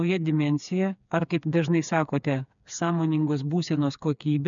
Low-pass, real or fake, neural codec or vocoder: 7.2 kHz; fake; codec, 16 kHz, 4 kbps, FreqCodec, smaller model